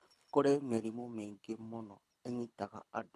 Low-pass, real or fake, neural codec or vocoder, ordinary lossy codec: none; fake; codec, 24 kHz, 6 kbps, HILCodec; none